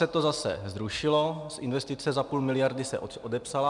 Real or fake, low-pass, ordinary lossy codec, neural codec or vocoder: real; 10.8 kHz; MP3, 96 kbps; none